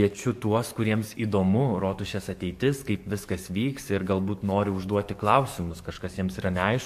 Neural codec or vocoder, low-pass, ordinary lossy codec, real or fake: codec, 44.1 kHz, 7.8 kbps, DAC; 14.4 kHz; MP3, 64 kbps; fake